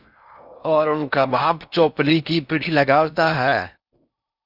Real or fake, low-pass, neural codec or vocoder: fake; 5.4 kHz; codec, 16 kHz in and 24 kHz out, 0.6 kbps, FocalCodec, streaming, 4096 codes